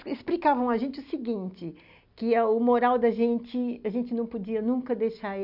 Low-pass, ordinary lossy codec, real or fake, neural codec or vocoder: 5.4 kHz; none; real; none